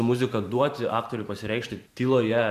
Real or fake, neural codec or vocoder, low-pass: fake; vocoder, 44.1 kHz, 128 mel bands every 512 samples, BigVGAN v2; 14.4 kHz